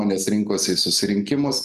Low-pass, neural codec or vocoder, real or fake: 14.4 kHz; none; real